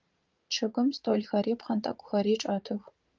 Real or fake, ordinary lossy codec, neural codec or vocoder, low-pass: fake; Opus, 24 kbps; vocoder, 44.1 kHz, 80 mel bands, Vocos; 7.2 kHz